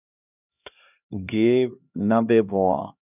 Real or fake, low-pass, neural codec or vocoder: fake; 3.6 kHz; codec, 16 kHz, 1 kbps, X-Codec, HuBERT features, trained on LibriSpeech